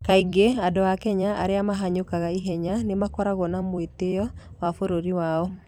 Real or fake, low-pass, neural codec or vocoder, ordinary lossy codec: fake; 19.8 kHz; vocoder, 44.1 kHz, 128 mel bands every 256 samples, BigVGAN v2; none